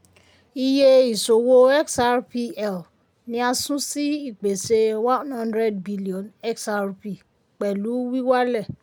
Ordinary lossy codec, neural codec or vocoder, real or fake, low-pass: none; none; real; none